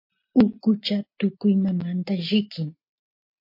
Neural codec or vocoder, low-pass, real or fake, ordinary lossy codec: none; 5.4 kHz; real; MP3, 32 kbps